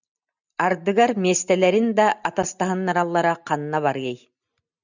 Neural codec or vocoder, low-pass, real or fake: none; 7.2 kHz; real